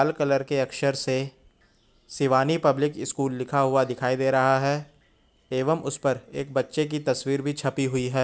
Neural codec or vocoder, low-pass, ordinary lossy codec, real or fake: none; none; none; real